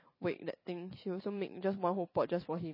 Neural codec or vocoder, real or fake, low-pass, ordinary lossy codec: vocoder, 44.1 kHz, 128 mel bands every 512 samples, BigVGAN v2; fake; 5.4 kHz; MP3, 24 kbps